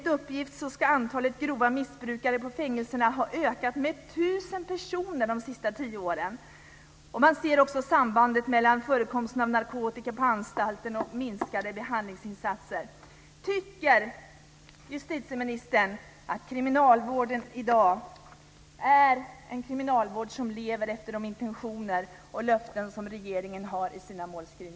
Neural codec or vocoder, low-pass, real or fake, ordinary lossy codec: none; none; real; none